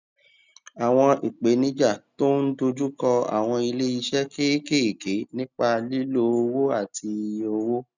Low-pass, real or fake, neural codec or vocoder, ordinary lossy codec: 7.2 kHz; real; none; none